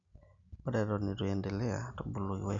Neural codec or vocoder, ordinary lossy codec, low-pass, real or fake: none; MP3, 64 kbps; 7.2 kHz; real